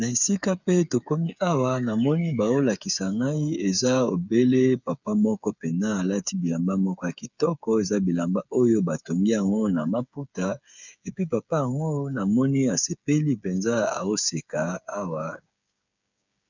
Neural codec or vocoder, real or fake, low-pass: codec, 16 kHz, 8 kbps, FreqCodec, smaller model; fake; 7.2 kHz